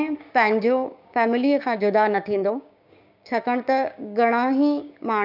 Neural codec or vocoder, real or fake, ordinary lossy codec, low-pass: codec, 16 kHz, 6 kbps, DAC; fake; none; 5.4 kHz